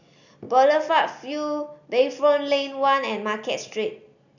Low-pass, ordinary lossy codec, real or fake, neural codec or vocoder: 7.2 kHz; none; real; none